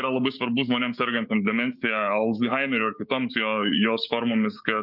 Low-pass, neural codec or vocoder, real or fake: 5.4 kHz; codec, 16 kHz, 6 kbps, DAC; fake